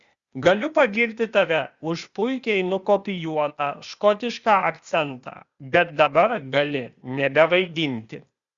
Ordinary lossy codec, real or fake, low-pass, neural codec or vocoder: Opus, 64 kbps; fake; 7.2 kHz; codec, 16 kHz, 0.8 kbps, ZipCodec